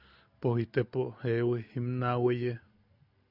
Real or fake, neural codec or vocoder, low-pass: real; none; 5.4 kHz